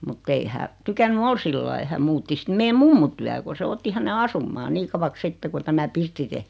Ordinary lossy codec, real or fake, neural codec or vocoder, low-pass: none; real; none; none